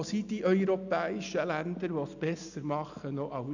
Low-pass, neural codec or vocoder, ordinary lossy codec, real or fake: 7.2 kHz; none; none; real